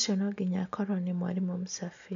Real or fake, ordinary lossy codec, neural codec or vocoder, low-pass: real; none; none; 7.2 kHz